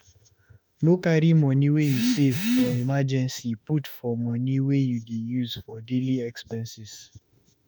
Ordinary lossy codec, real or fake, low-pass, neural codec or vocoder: none; fake; none; autoencoder, 48 kHz, 32 numbers a frame, DAC-VAE, trained on Japanese speech